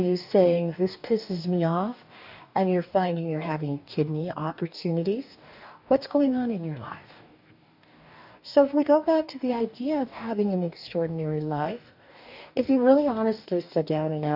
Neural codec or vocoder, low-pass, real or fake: codec, 44.1 kHz, 2.6 kbps, DAC; 5.4 kHz; fake